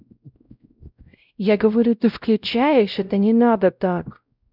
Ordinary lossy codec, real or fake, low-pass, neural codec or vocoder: none; fake; 5.4 kHz; codec, 16 kHz, 0.5 kbps, X-Codec, WavLM features, trained on Multilingual LibriSpeech